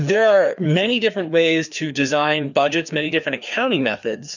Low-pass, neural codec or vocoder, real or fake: 7.2 kHz; codec, 16 kHz, 2 kbps, FreqCodec, larger model; fake